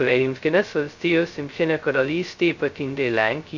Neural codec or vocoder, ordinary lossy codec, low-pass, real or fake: codec, 16 kHz, 0.2 kbps, FocalCodec; Opus, 64 kbps; 7.2 kHz; fake